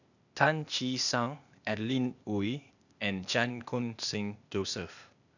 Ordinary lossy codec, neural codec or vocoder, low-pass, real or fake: none; codec, 16 kHz, 0.8 kbps, ZipCodec; 7.2 kHz; fake